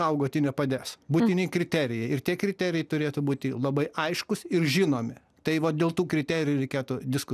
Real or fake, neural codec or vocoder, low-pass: real; none; 14.4 kHz